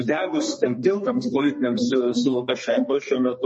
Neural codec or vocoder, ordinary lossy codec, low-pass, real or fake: codec, 32 kHz, 1.9 kbps, SNAC; MP3, 32 kbps; 10.8 kHz; fake